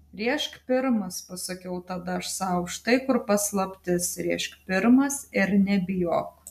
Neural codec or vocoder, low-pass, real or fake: none; 14.4 kHz; real